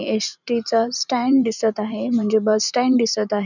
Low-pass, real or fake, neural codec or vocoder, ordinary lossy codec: 7.2 kHz; fake; vocoder, 44.1 kHz, 128 mel bands every 512 samples, BigVGAN v2; none